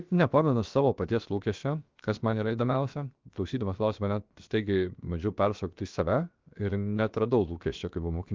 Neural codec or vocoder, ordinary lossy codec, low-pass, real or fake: codec, 16 kHz, 0.7 kbps, FocalCodec; Opus, 32 kbps; 7.2 kHz; fake